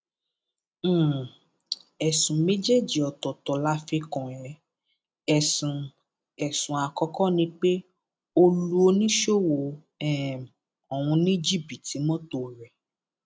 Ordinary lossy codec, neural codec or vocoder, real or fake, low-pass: none; none; real; none